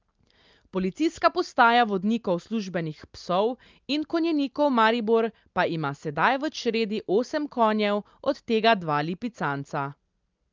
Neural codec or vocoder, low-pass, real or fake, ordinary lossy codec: none; 7.2 kHz; real; Opus, 24 kbps